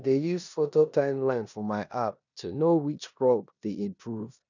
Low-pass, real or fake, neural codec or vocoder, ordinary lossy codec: 7.2 kHz; fake; codec, 16 kHz in and 24 kHz out, 0.9 kbps, LongCat-Audio-Codec, four codebook decoder; none